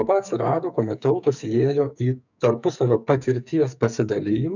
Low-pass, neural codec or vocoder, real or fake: 7.2 kHz; codec, 44.1 kHz, 2.6 kbps, SNAC; fake